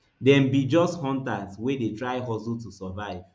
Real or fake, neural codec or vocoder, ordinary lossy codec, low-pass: real; none; none; none